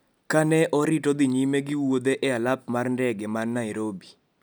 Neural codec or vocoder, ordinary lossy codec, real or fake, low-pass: none; none; real; none